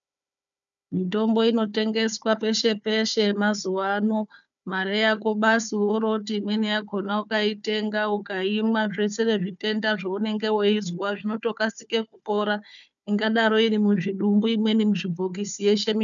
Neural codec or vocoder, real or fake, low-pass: codec, 16 kHz, 4 kbps, FunCodec, trained on Chinese and English, 50 frames a second; fake; 7.2 kHz